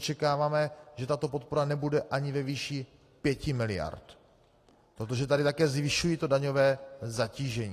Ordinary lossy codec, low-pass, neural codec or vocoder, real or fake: AAC, 48 kbps; 14.4 kHz; none; real